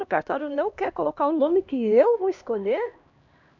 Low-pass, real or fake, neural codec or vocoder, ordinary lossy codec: 7.2 kHz; fake; codec, 16 kHz, 1 kbps, X-Codec, HuBERT features, trained on LibriSpeech; none